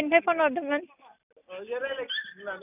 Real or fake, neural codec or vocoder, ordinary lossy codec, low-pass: real; none; none; 3.6 kHz